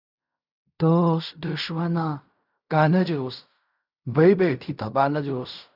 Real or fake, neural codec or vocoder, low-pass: fake; codec, 16 kHz in and 24 kHz out, 0.4 kbps, LongCat-Audio-Codec, fine tuned four codebook decoder; 5.4 kHz